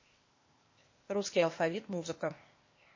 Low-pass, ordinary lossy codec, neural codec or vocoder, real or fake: 7.2 kHz; MP3, 32 kbps; codec, 16 kHz, 0.8 kbps, ZipCodec; fake